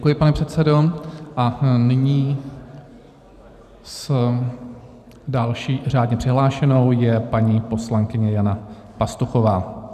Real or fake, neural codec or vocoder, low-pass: fake; vocoder, 44.1 kHz, 128 mel bands every 512 samples, BigVGAN v2; 14.4 kHz